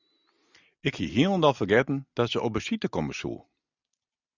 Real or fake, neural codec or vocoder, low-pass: real; none; 7.2 kHz